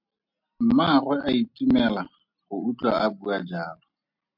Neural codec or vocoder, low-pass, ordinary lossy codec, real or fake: none; 5.4 kHz; MP3, 48 kbps; real